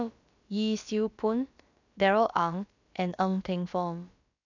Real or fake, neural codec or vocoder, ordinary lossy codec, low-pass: fake; codec, 16 kHz, about 1 kbps, DyCAST, with the encoder's durations; none; 7.2 kHz